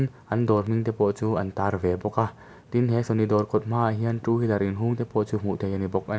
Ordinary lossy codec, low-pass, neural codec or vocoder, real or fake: none; none; none; real